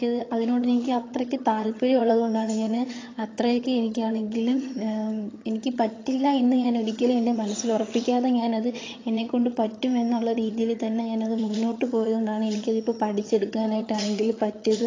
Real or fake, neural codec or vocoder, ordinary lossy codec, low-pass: fake; vocoder, 22.05 kHz, 80 mel bands, HiFi-GAN; AAC, 32 kbps; 7.2 kHz